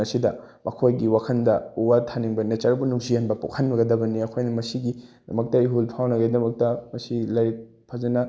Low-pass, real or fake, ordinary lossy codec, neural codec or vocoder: none; real; none; none